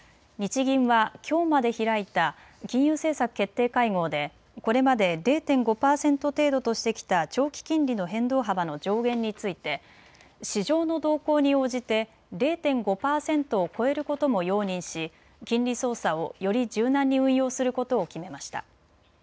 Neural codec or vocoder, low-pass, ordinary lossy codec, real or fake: none; none; none; real